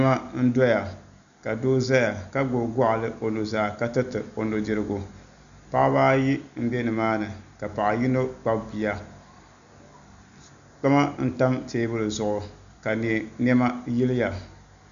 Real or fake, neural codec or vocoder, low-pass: real; none; 7.2 kHz